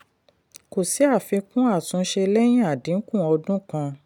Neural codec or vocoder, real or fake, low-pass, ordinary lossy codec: none; real; 19.8 kHz; none